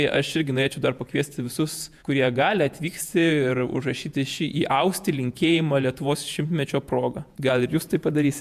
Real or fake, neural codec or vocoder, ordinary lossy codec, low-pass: fake; vocoder, 48 kHz, 128 mel bands, Vocos; MP3, 96 kbps; 14.4 kHz